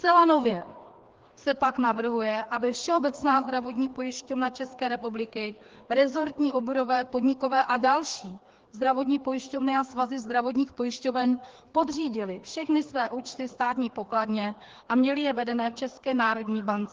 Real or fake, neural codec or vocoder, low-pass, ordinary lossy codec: fake; codec, 16 kHz, 2 kbps, FreqCodec, larger model; 7.2 kHz; Opus, 16 kbps